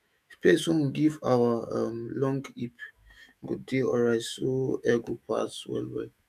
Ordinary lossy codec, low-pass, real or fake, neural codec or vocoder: none; 14.4 kHz; fake; autoencoder, 48 kHz, 128 numbers a frame, DAC-VAE, trained on Japanese speech